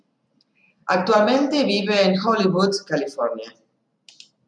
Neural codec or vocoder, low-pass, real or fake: none; 9.9 kHz; real